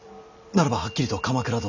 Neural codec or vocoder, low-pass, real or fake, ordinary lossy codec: none; 7.2 kHz; real; none